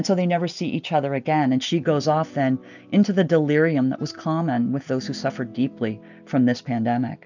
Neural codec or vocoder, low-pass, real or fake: none; 7.2 kHz; real